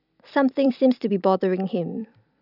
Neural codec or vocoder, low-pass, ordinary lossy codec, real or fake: vocoder, 44.1 kHz, 128 mel bands every 256 samples, BigVGAN v2; 5.4 kHz; none; fake